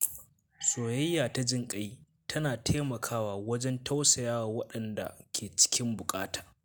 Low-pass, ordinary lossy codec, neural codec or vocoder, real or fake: none; none; none; real